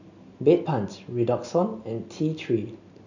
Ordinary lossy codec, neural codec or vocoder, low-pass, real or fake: none; none; 7.2 kHz; real